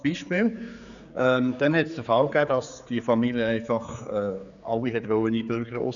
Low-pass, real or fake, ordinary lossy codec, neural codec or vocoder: 7.2 kHz; fake; none; codec, 16 kHz, 4 kbps, X-Codec, HuBERT features, trained on general audio